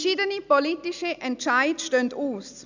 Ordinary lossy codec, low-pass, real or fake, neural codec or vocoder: none; 7.2 kHz; real; none